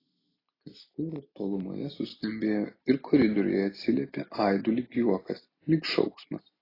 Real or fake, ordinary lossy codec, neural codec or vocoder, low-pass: real; AAC, 24 kbps; none; 5.4 kHz